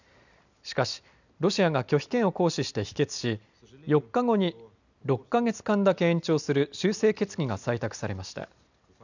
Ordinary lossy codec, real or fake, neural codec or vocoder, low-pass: none; real; none; 7.2 kHz